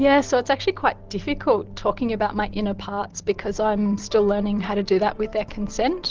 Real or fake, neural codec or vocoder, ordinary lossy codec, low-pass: real; none; Opus, 16 kbps; 7.2 kHz